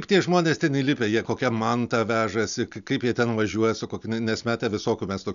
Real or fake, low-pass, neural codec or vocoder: real; 7.2 kHz; none